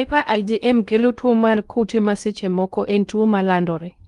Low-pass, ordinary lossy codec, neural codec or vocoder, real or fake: 10.8 kHz; Opus, 32 kbps; codec, 16 kHz in and 24 kHz out, 0.6 kbps, FocalCodec, streaming, 2048 codes; fake